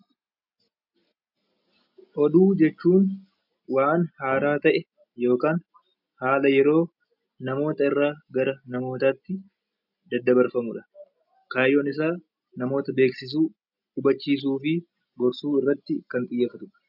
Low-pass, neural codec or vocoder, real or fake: 5.4 kHz; none; real